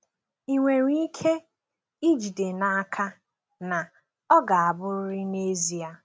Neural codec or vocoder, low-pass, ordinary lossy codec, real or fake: none; none; none; real